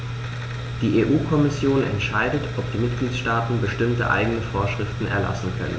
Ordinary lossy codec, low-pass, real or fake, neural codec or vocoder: none; none; real; none